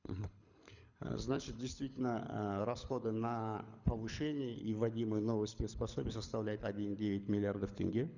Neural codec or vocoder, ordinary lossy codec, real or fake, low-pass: codec, 24 kHz, 6 kbps, HILCodec; Opus, 64 kbps; fake; 7.2 kHz